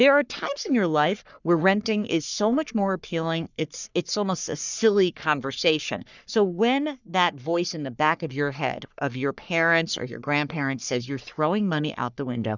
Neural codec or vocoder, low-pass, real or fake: codec, 44.1 kHz, 3.4 kbps, Pupu-Codec; 7.2 kHz; fake